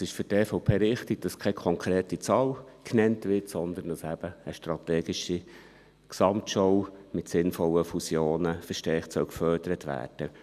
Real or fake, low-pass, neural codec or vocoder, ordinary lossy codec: real; 14.4 kHz; none; none